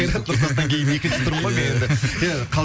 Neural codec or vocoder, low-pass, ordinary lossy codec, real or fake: none; none; none; real